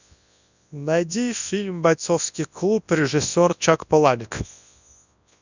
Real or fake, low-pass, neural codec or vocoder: fake; 7.2 kHz; codec, 24 kHz, 0.9 kbps, WavTokenizer, large speech release